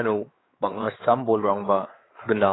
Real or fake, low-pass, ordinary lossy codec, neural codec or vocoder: fake; 7.2 kHz; AAC, 16 kbps; codec, 16 kHz, 4 kbps, X-Codec, WavLM features, trained on Multilingual LibriSpeech